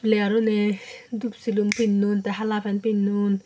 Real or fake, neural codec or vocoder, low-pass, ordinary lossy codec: real; none; none; none